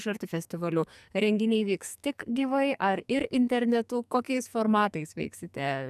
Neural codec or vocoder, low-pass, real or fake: codec, 44.1 kHz, 2.6 kbps, SNAC; 14.4 kHz; fake